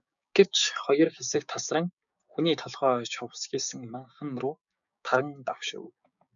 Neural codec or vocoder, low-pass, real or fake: codec, 16 kHz, 6 kbps, DAC; 7.2 kHz; fake